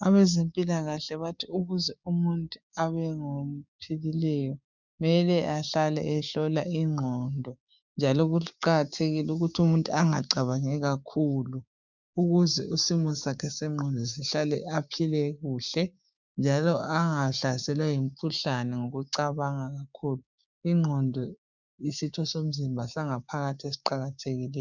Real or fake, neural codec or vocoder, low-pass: fake; codec, 16 kHz, 6 kbps, DAC; 7.2 kHz